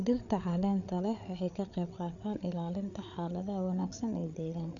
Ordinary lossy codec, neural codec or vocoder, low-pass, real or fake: none; codec, 16 kHz, 8 kbps, FreqCodec, larger model; 7.2 kHz; fake